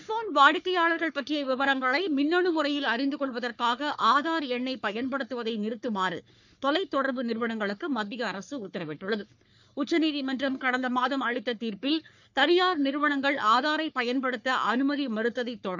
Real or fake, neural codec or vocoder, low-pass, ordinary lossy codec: fake; codec, 44.1 kHz, 3.4 kbps, Pupu-Codec; 7.2 kHz; none